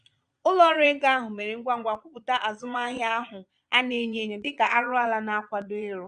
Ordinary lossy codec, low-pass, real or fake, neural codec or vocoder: none; 9.9 kHz; fake; vocoder, 22.05 kHz, 80 mel bands, Vocos